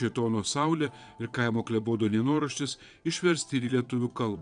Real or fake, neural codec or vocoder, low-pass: fake; vocoder, 22.05 kHz, 80 mel bands, Vocos; 9.9 kHz